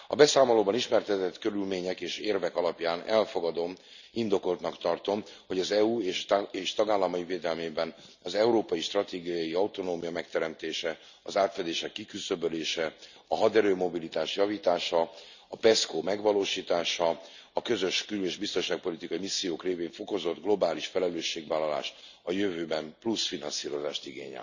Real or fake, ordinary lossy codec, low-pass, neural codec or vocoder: real; none; 7.2 kHz; none